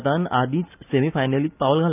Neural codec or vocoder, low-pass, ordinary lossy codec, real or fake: none; 3.6 kHz; none; real